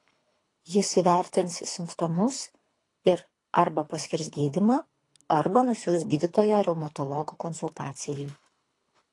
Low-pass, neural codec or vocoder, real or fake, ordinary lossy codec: 10.8 kHz; codec, 24 kHz, 3 kbps, HILCodec; fake; AAC, 48 kbps